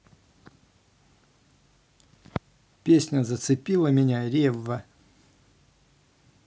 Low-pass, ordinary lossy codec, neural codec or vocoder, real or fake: none; none; none; real